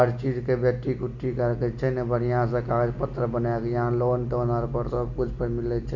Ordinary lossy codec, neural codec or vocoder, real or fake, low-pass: none; none; real; 7.2 kHz